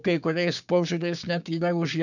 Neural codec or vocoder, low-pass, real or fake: codec, 16 kHz, 6 kbps, DAC; 7.2 kHz; fake